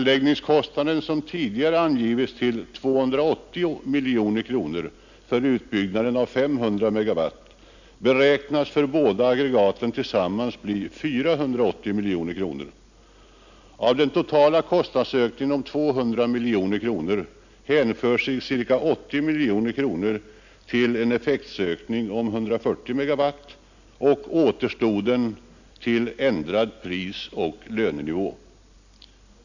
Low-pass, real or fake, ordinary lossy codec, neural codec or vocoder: 7.2 kHz; real; none; none